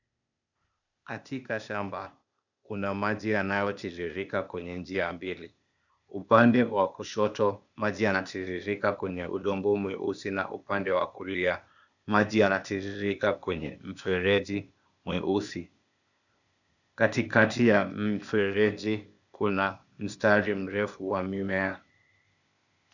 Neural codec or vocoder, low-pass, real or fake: codec, 16 kHz, 0.8 kbps, ZipCodec; 7.2 kHz; fake